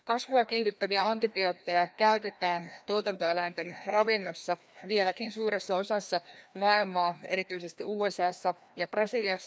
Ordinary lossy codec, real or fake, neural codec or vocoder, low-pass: none; fake; codec, 16 kHz, 1 kbps, FreqCodec, larger model; none